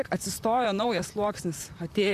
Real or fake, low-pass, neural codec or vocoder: fake; 14.4 kHz; vocoder, 44.1 kHz, 128 mel bands, Pupu-Vocoder